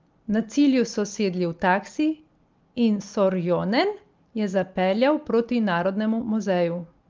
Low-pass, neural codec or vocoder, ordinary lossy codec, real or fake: 7.2 kHz; none; Opus, 32 kbps; real